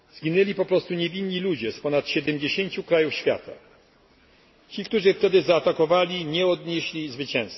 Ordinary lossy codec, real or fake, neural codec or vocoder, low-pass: MP3, 24 kbps; real; none; 7.2 kHz